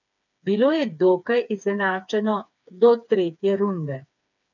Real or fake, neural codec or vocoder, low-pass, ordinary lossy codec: fake; codec, 16 kHz, 4 kbps, FreqCodec, smaller model; 7.2 kHz; none